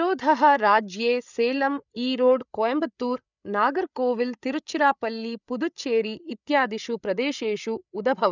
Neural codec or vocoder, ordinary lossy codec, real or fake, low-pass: codec, 16 kHz, 8 kbps, FreqCodec, larger model; none; fake; 7.2 kHz